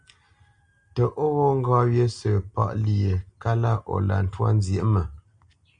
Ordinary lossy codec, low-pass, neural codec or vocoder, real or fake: MP3, 96 kbps; 9.9 kHz; none; real